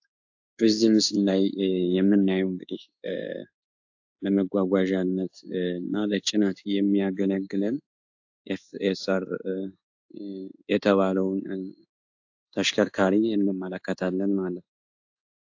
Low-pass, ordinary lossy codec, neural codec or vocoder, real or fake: 7.2 kHz; AAC, 48 kbps; codec, 16 kHz in and 24 kHz out, 1 kbps, XY-Tokenizer; fake